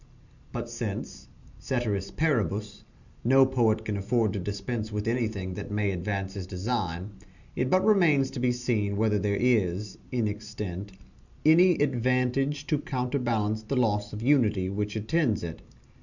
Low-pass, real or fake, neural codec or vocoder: 7.2 kHz; real; none